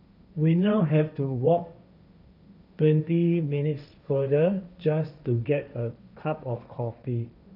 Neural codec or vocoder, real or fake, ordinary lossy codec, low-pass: codec, 16 kHz, 1.1 kbps, Voila-Tokenizer; fake; none; 5.4 kHz